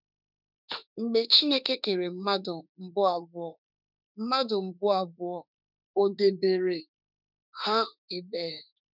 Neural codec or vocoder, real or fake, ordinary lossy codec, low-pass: autoencoder, 48 kHz, 32 numbers a frame, DAC-VAE, trained on Japanese speech; fake; none; 5.4 kHz